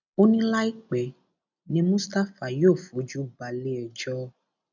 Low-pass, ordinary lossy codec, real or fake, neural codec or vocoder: 7.2 kHz; none; real; none